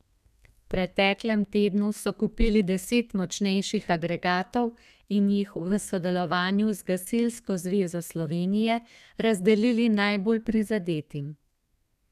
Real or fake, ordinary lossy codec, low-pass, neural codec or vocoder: fake; none; 14.4 kHz; codec, 32 kHz, 1.9 kbps, SNAC